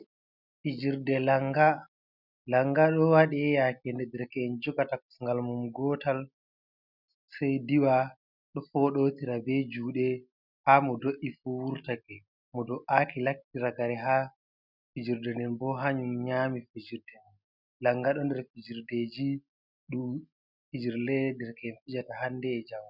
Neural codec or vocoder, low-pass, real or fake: none; 5.4 kHz; real